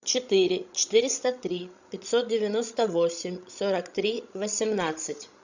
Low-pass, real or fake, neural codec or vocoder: 7.2 kHz; fake; codec, 16 kHz, 16 kbps, FreqCodec, larger model